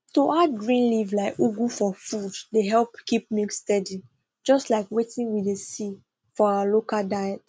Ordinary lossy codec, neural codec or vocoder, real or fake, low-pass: none; none; real; none